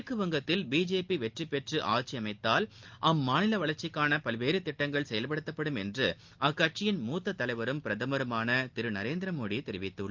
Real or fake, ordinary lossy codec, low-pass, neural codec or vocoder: real; Opus, 32 kbps; 7.2 kHz; none